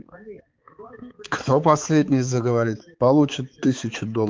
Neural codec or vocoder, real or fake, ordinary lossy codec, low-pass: codec, 16 kHz, 4 kbps, X-Codec, HuBERT features, trained on balanced general audio; fake; Opus, 32 kbps; 7.2 kHz